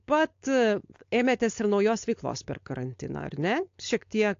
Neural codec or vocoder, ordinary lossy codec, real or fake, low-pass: codec, 16 kHz, 4.8 kbps, FACodec; AAC, 48 kbps; fake; 7.2 kHz